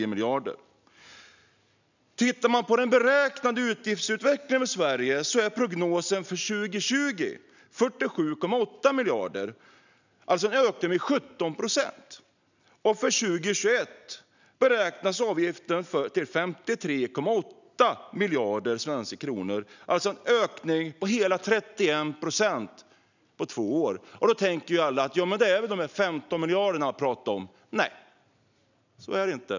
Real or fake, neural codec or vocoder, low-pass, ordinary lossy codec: real; none; 7.2 kHz; none